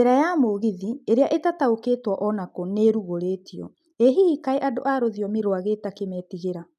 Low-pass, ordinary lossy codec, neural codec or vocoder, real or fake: 14.4 kHz; none; none; real